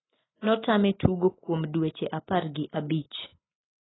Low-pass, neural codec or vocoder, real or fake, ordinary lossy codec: 7.2 kHz; none; real; AAC, 16 kbps